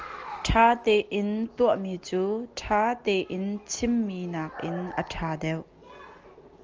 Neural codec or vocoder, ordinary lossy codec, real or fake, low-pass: none; Opus, 24 kbps; real; 7.2 kHz